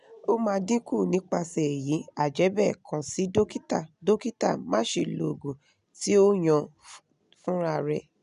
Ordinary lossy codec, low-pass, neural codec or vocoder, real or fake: none; 10.8 kHz; none; real